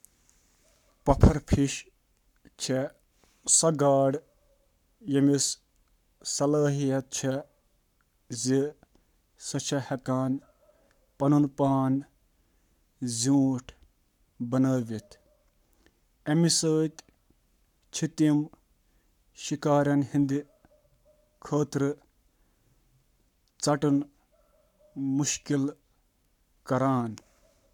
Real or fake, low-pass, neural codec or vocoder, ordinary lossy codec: fake; 19.8 kHz; codec, 44.1 kHz, 7.8 kbps, Pupu-Codec; none